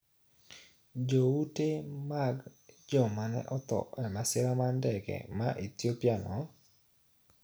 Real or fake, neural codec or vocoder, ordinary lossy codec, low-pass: real; none; none; none